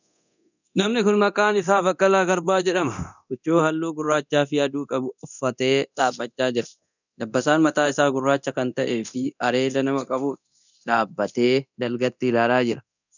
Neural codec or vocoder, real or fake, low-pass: codec, 24 kHz, 0.9 kbps, DualCodec; fake; 7.2 kHz